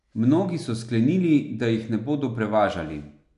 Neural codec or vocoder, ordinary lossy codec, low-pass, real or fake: none; none; 10.8 kHz; real